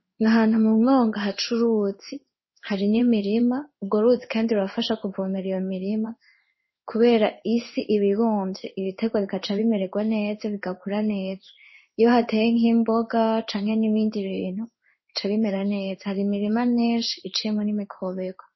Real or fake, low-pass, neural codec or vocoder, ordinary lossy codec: fake; 7.2 kHz; codec, 16 kHz in and 24 kHz out, 1 kbps, XY-Tokenizer; MP3, 24 kbps